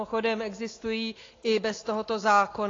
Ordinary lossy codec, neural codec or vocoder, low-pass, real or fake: AAC, 32 kbps; none; 7.2 kHz; real